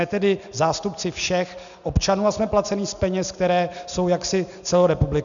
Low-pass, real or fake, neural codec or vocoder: 7.2 kHz; real; none